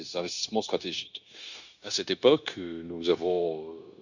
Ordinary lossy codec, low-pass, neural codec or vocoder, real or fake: none; 7.2 kHz; codec, 16 kHz, 0.9 kbps, LongCat-Audio-Codec; fake